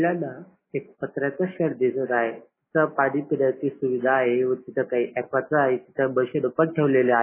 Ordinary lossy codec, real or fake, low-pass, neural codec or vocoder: MP3, 16 kbps; real; 3.6 kHz; none